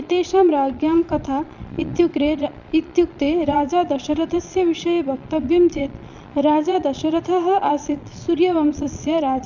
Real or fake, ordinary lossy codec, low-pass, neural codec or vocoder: fake; none; 7.2 kHz; vocoder, 22.05 kHz, 80 mel bands, Vocos